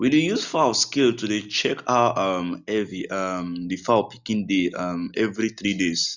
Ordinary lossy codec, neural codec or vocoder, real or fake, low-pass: none; none; real; 7.2 kHz